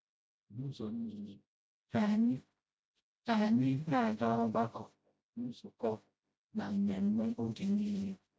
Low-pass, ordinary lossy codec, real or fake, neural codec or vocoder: none; none; fake; codec, 16 kHz, 0.5 kbps, FreqCodec, smaller model